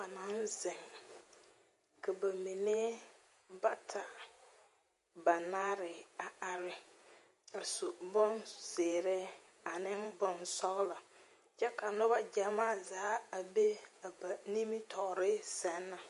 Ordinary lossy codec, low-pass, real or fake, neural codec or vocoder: MP3, 48 kbps; 14.4 kHz; fake; vocoder, 48 kHz, 128 mel bands, Vocos